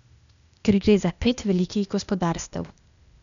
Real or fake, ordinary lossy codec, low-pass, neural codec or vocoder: fake; none; 7.2 kHz; codec, 16 kHz, 0.8 kbps, ZipCodec